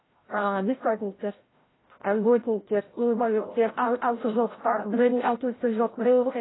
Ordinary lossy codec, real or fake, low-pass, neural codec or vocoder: AAC, 16 kbps; fake; 7.2 kHz; codec, 16 kHz, 0.5 kbps, FreqCodec, larger model